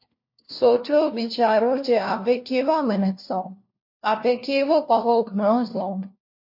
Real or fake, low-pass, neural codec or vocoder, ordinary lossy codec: fake; 5.4 kHz; codec, 16 kHz, 1 kbps, FunCodec, trained on LibriTTS, 50 frames a second; MP3, 32 kbps